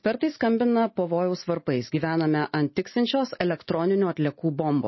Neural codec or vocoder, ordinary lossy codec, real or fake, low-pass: none; MP3, 24 kbps; real; 7.2 kHz